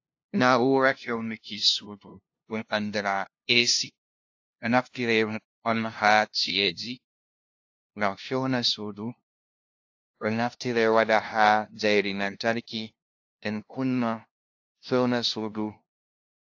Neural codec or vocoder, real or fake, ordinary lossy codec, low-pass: codec, 16 kHz, 0.5 kbps, FunCodec, trained on LibriTTS, 25 frames a second; fake; AAC, 48 kbps; 7.2 kHz